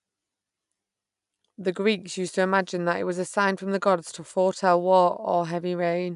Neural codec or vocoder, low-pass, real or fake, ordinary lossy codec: none; 10.8 kHz; real; none